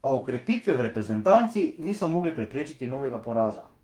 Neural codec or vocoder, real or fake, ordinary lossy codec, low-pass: codec, 44.1 kHz, 2.6 kbps, DAC; fake; Opus, 32 kbps; 19.8 kHz